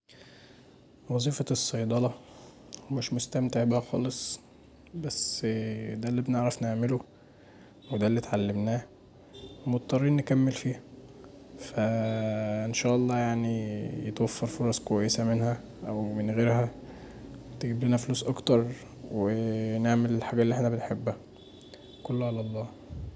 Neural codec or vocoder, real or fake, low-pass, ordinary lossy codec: none; real; none; none